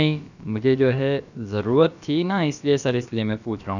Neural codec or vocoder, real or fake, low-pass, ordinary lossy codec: codec, 16 kHz, about 1 kbps, DyCAST, with the encoder's durations; fake; 7.2 kHz; none